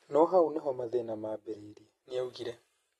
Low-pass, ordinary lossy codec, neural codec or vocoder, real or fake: 19.8 kHz; AAC, 32 kbps; none; real